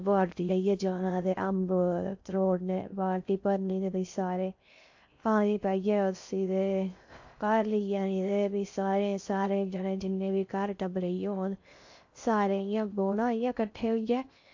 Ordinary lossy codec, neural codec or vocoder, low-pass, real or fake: AAC, 48 kbps; codec, 16 kHz in and 24 kHz out, 0.6 kbps, FocalCodec, streaming, 4096 codes; 7.2 kHz; fake